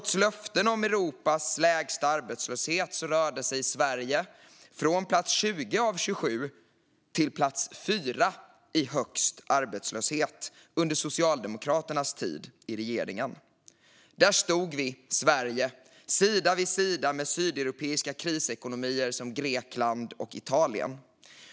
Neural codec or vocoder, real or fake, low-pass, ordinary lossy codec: none; real; none; none